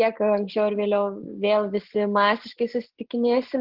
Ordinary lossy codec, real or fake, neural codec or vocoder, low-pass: Opus, 16 kbps; real; none; 5.4 kHz